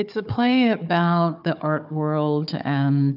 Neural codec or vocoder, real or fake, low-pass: codec, 16 kHz, 4 kbps, FunCodec, trained on Chinese and English, 50 frames a second; fake; 5.4 kHz